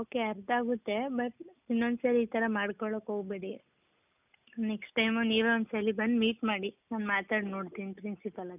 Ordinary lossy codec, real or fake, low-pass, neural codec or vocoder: none; real; 3.6 kHz; none